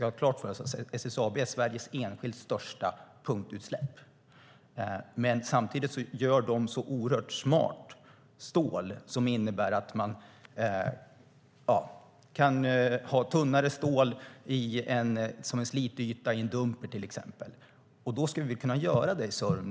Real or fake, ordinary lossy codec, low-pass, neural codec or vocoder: real; none; none; none